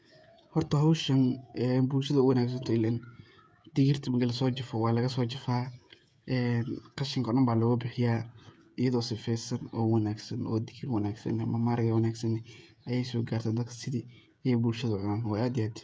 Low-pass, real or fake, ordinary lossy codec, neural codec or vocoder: none; fake; none; codec, 16 kHz, 16 kbps, FreqCodec, smaller model